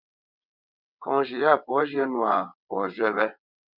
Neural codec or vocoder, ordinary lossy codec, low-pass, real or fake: vocoder, 22.05 kHz, 80 mel bands, WaveNeXt; Opus, 64 kbps; 5.4 kHz; fake